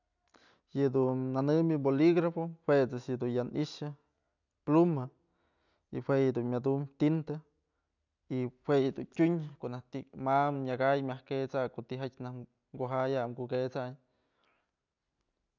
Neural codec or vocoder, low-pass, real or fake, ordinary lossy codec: none; 7.2 kHz; real; none